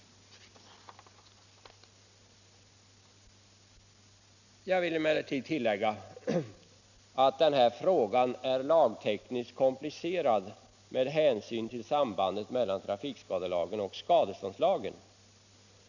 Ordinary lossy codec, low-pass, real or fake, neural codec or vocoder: none; 7.2 kHz; real; none